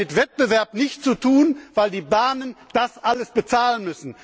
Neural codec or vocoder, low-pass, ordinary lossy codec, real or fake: none; none; none; real